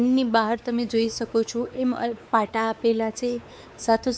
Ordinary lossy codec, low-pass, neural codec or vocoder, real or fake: none; none; codec, 16 kHz, 4 kbps, X-Codec, WavLM features, trained on Multilingual LibriSpeech; fake